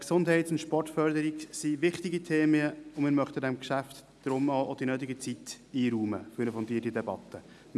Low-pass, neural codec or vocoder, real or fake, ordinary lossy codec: none; none; real; none